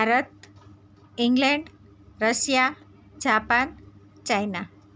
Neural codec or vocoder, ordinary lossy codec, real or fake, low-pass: none; none; real; none